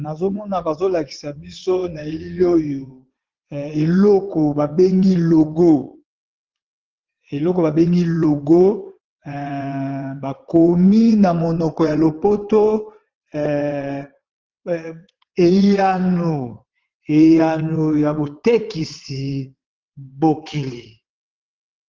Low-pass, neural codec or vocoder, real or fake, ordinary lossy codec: 7.2 kHz; vocoder, 22.05 kHz, 80 mel bands, WaveNeXt; fake; Opus, 16 kbps